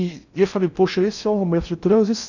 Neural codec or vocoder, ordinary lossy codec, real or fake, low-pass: codec, 16 kHz in and 24 kHz out, 0.6 kbps, FocalCodec, streaming, 4096 codes; none; fake; 7.2 kHz